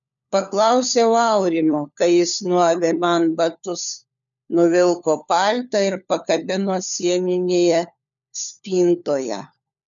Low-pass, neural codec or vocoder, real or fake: 7.2 kHz; codec, 16 kHz, 4 kbps, FunCodec, trained on LibriTTS, 50 frames a second; fake